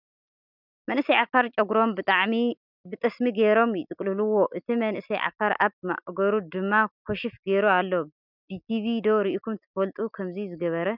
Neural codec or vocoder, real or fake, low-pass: none; real; 5.4 kHz